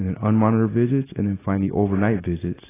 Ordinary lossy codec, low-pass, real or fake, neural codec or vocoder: AAC, 16 kbps; 3.6 kHz; real; none